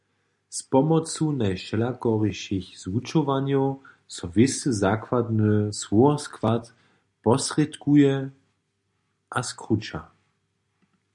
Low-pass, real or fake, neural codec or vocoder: 10.8 kHz; real; none